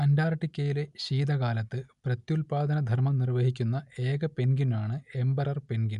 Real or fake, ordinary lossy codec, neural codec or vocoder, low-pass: real; none; none; 10.8 kHz